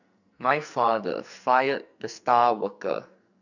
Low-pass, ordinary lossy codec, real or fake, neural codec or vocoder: 7.2 kHz; none; fake; codec, 44.1 kHz, 2.6 kbps, SNAC